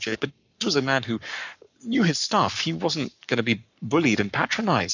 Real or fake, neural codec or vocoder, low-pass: fake; codec, 44.1 kHz, 7.8 kbps, Pupu-Codec; 7.2 kHz